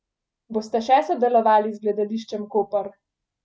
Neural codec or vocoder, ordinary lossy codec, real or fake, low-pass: none; none; real; none